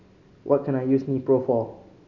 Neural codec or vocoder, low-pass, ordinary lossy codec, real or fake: none; 7.2 kHz; none; real